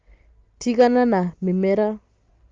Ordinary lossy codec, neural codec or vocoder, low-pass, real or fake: Opus, 32 kbps; none; 7.2 kHz; real